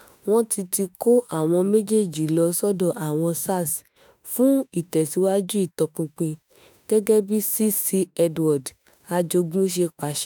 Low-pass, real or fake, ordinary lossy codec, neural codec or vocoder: none; fake; none; autoencoder, 48 kHz, 32 numbers a frame, DAC-VAE, trained on Japanese speech